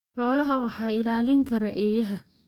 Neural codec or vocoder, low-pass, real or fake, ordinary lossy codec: codec, 44.1 kHz, 2.6 kbps, DAC; 19.8 kHz; fake; MP3, 96 kbps